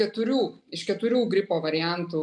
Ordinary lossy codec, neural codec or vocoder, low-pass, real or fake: MP3, 96 kbps; none; 10.8 kHz; real